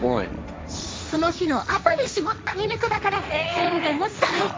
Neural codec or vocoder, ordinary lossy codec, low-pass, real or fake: codec, 16 kHz, 1.1 kbps, Voila-Tokenizer; none; none; fake